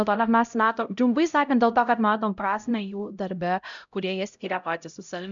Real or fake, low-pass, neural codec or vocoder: fake; 7.2 kHz; codec, 16 kHz, 0.5 kbps, X-Codec, HuBERT features, trained on LibriSpeech